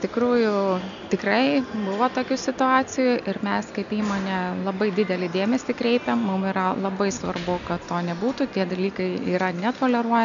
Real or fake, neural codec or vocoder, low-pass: real; none; 7.2 kHz